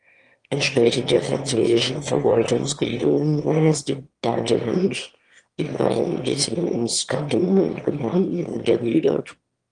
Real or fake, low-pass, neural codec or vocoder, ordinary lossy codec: fake; 9.9 kHz; autoencoder, 22.05 kHz, a latent of 192 numbers a frame, VITS, trained on one speaker; Opus, 24 kbps